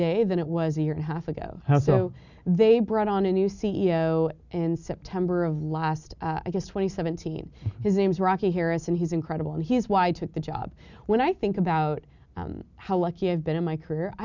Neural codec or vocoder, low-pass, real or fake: none; 7.2 kHz; real